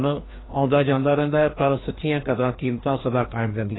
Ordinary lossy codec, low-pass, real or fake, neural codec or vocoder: AAC, 16 kbps; 7.2 kHz; fake; codec, 16 kHz, 2 kbps, FreqCodec, larger model